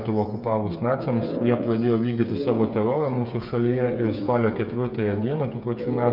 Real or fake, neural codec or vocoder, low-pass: fake; codec, 16 kHz, 8 kbps, FreqCodec, smaller model; 5.4 kHz